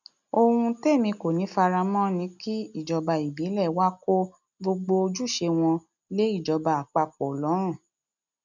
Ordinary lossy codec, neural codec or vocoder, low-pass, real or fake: none; none; 7.2 kHz; real